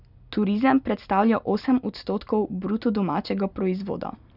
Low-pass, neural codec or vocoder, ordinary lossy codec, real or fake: 5.4 kHz; none; none; real